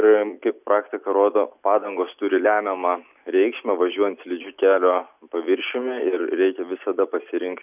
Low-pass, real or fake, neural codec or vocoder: 3.6 kHz; real; none